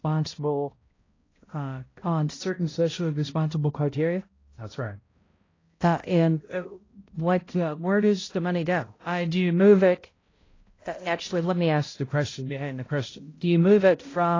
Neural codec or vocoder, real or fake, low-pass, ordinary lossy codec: codec, 16 kHz, 0.5 kbps, X-Codec, HuBERT features, trained on balanced general audio; fake; 7.2 kHz; AAC, 32 kbps